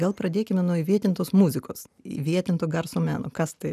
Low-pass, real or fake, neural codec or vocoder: 14.4 kHz; real; none